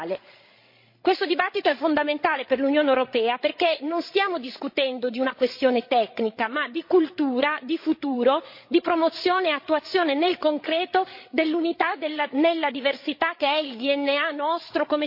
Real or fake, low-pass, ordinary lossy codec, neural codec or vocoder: fake; 5.4 kHz; MP3, 32 kbps; codec, 16 kHz, 16 kbps, FreqCodec, larger model